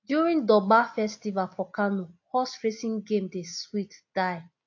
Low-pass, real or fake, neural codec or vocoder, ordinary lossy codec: 7.2 kHz; real; none; AAC, 48 kbps